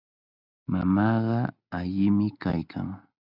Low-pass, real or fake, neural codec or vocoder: 5.4 kHz; real; none